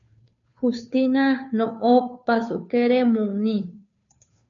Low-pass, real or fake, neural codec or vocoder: 7.2 kHz; fake; codec, 16 kHz, 2 kbps, FunCodec, trained on Chinese and English, 25 frames a second